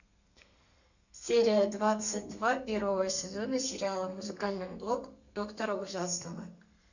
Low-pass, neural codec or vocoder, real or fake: 7.2 kHz; codec, 32 kHz, 1.9 kbps, SNAC; fake